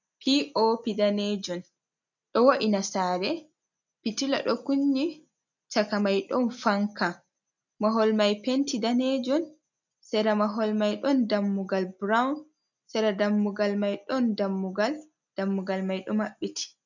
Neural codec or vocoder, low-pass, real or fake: none; 7.2 kHz; real